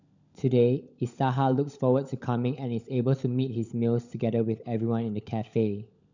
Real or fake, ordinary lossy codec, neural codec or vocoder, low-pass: fake; none; codec, 16 kHz, 16 kbps, FunCodec, trained on LibriTTS, 50 frames a second; 7.2 kHz